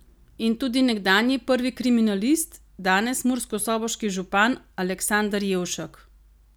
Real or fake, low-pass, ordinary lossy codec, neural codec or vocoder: real; none; none; none